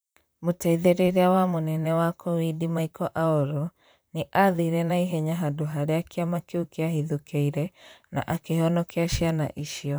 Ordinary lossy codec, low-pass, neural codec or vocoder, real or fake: none; none; vocoder, 44.1 kHz, 128 mel bands, Pupu-Vocoder; fake